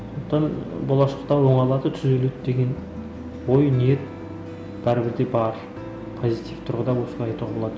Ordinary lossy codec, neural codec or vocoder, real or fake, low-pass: none; none; real; none